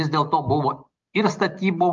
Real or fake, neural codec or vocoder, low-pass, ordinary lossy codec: real; none; 7.2 kHz; Opus, 24 kbps